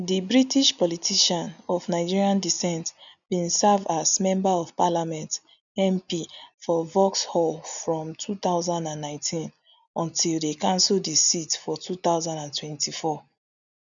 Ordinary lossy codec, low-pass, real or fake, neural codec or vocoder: none; 7.2 kHz; real; none